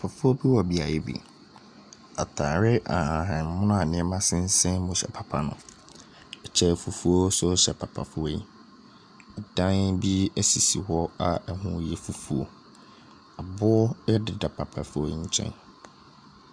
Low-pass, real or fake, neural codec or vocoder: 9.9 kHz; real; none